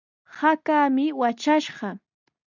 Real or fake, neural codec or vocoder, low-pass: real; none; 7.2 kHz